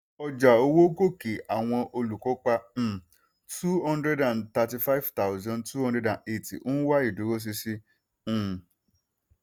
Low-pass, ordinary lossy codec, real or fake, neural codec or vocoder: none; none; real; none